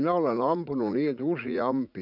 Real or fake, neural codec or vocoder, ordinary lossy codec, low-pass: fake; vocoder, 44.1 kHz, 80 mel bands, Vocos; none; 5.4 kHz